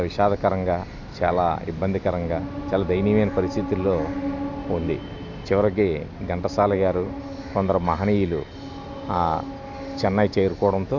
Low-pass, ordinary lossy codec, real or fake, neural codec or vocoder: 7.2 kHz; none; real; none